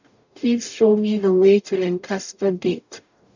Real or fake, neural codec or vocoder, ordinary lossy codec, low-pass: fake; codec, 44.1 kHz, 0.9 kbps, DAC; none; 7.2 kHz